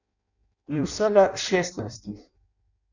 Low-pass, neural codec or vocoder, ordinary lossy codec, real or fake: 7.2 kHz; codec, 16 kHz in and 24 kHz out, 0.6 kbps, FireRedTTS-2 codec; none; fake